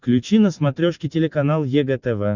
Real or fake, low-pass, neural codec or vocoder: real; 7.2 kHz; none